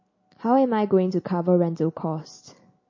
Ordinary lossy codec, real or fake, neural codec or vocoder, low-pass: MP3, 32 kbps; real; none; 7.2 kHz